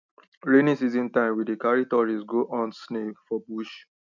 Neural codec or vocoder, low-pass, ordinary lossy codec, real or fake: none; 7.2 kHz; none; real